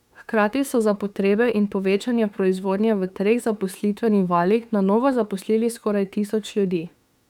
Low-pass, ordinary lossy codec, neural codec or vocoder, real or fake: 19.8 kHz; none; autoencoder, 48 kHz, 32 numbers a frame, DAC-VAE, trained on Japanese speech; fake